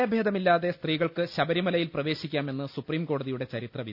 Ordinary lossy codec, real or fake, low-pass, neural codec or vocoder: none; real; 5.4 kHz; none